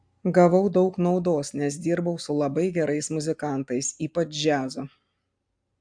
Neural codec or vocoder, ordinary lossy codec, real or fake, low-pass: none; AAC, 64 kbps; real; 9.9 kHz